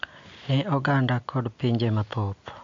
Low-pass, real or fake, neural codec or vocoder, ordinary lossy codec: 7.2 kHz; real; none; MP3, 48 kbps